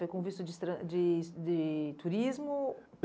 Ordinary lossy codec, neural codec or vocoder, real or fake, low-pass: none; none; real; none